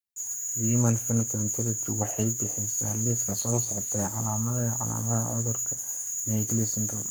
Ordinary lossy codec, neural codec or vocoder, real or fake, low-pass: none; codec, 44.1 kHz, 7.8 kbps, Pupu-Codec; fake; none